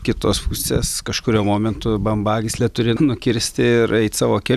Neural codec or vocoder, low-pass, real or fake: none; 14.4 kHz; real